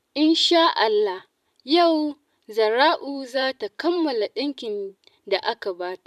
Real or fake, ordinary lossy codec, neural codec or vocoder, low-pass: fake; none; vocoder, 44.1 kHz, 128 mel bands every 512 samples, BigVGAN v2; 14.4 kHz